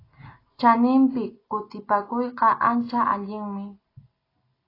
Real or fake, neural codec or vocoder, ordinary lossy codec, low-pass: real; none; AAC, 24 kbps; 5.4 kHz